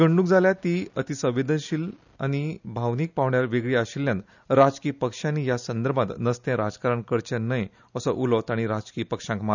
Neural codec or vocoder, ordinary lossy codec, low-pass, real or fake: none; none; 7.2 kHz; real